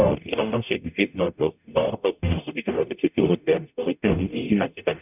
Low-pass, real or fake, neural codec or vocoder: 3.6 kHz; fake; codec, 44.1 kHz, 0.9 kbps, DAC